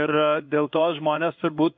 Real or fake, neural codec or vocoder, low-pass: fake; codec, 16 kHz in and 24 kHz out, 1 kbps, XY-Tokenizer; 7.2 kHz